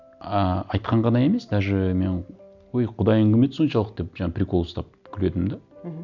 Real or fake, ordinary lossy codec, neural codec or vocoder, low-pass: real; none; none; 7.2 kHz